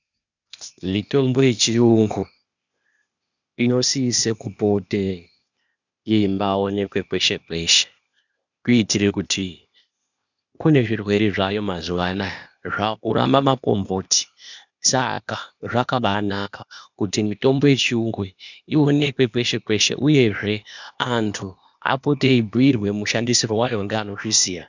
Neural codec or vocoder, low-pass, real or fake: codec, 16 kHz, 0.8 kbps, ZipCodec; 7.2 kHz; fake